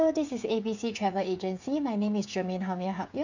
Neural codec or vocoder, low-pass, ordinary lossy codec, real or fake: codec, 16 kHz, 8 kbps, FreqCodec, smaller model; 7.2 kHz; none; fake